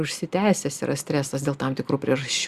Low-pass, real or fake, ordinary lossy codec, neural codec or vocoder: 14.4 kHz; real; Opus, 64 kbps; none